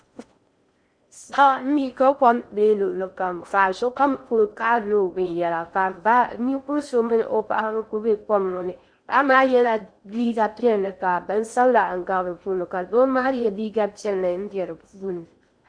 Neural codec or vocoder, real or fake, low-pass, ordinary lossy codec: codec, 16 kHz in and 24 kHz out, 0.6 kbps, FocalCodec, streaming, 4096 codes; fake; 9.9 kHz; Opus, 64 kbps